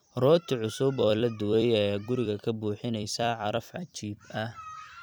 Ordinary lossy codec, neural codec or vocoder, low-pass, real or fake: none; vocoder, 44.1 kHz, 128 mel bands every 256 samples, BigVGAN v2; none; fake